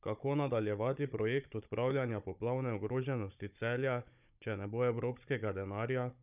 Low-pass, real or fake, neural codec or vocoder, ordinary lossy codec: 3.6 kHz; fake; codec, 16 kHz, 16 kbps, FunCodec, trained on Chinese and English, 50 frames a second; none